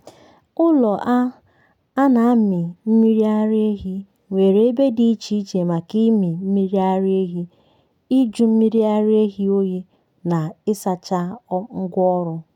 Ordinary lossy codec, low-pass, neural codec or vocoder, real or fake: none; 19.8 kHz; none; real